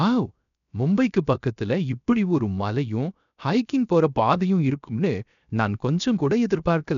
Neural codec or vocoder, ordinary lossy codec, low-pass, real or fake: codec, 16 kHz, 0.7 kbps, FocalCodec; none; 7.2 kHz; fake